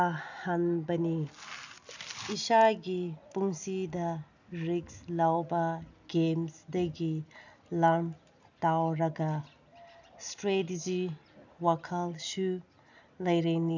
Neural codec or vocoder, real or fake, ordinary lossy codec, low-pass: none; real; none; 7.2 kHz